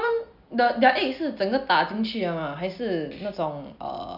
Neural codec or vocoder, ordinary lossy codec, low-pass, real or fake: none; none; 5.4 kHz; real